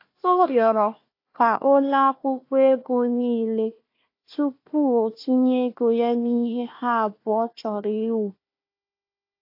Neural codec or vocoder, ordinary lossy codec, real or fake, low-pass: codec, 16 kHz, 1 kbps, FunCodec, trained on Chinese and English, 50 frames a second; AAC, 32 kbps; fake; 5.4 kHz